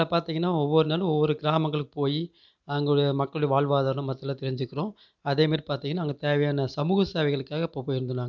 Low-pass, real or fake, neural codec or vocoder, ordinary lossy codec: 7.2 kHz; real; none; none